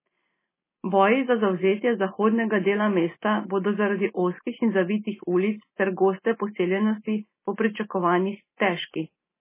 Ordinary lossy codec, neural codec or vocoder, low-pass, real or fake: MP3, 16 kbps; none; 3.6 kHz; real